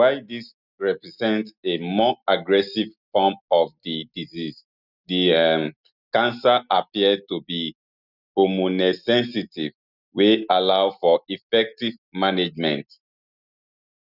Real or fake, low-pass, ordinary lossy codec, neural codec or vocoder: real; 5.4 kHz; none; none